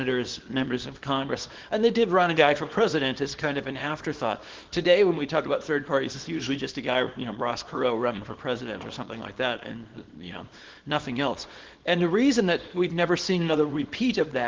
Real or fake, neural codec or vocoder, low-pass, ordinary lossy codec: fake; codec, 24 kHz, 0.9 kbps, WavTokenizer, small release; 7.2 kHz; Opus, 16 kbps